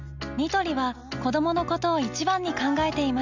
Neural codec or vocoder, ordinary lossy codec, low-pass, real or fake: none; none; 7.2 kHz; real